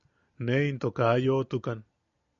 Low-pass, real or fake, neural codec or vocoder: 7.2 kHz; real; none